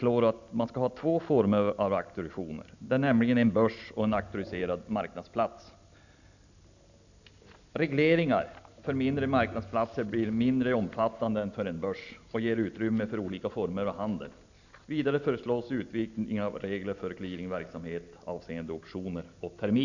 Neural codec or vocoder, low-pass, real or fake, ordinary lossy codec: none; 7.2 kHz; real; none